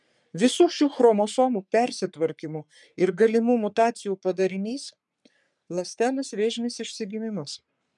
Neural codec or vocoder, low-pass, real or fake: codec, 44.1 kHz, 3.4 kbps, Pupu-Codec; 10.8 kHz; fake